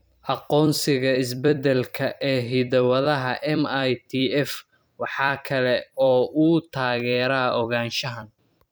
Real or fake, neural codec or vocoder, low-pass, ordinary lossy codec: fake; vocoder, 44.1 kHz, 128 mel bands every 256 samples, BigVGAN v2; none; none